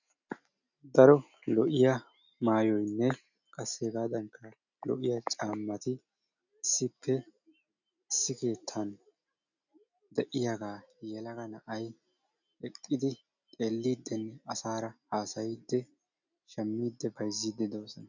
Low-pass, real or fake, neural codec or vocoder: 7.2 kHz; real; none